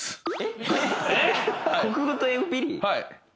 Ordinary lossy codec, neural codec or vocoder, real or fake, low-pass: none; none; real; none